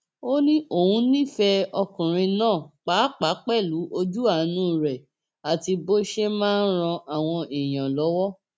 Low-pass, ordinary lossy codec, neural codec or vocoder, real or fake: none; none; none; real